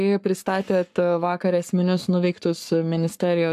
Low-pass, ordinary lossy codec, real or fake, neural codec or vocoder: 14.4 kHz; AAC, 96 kbps; fake; codec, 44.1 kHz, 7.8 kbps, Pupu-Codec